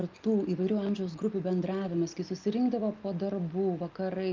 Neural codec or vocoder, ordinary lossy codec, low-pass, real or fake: none; Opus, 24 kbps; 7.2 kHz; real